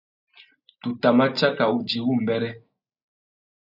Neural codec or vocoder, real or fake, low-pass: none; real; 5.4 kHz